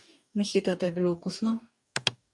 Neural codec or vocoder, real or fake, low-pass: codec, 44.1 kHz, 2.6 kbps, DAC; fake; 10.8 kHz